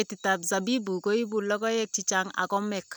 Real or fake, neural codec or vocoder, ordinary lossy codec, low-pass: real; none; none; none